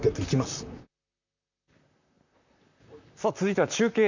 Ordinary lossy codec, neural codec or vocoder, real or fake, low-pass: none; vocoder, 44.1 kHz, 128 mel bands, Pupu-Vocoder; fake; 7.2 kHz